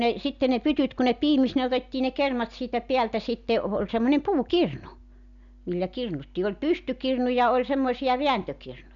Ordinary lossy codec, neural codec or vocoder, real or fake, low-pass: none; none; real; 7.2 kHz